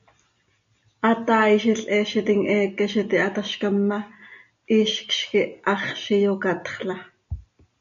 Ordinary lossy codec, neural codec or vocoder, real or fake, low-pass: MP3, 64 kbps; none; real; 7.2 kHz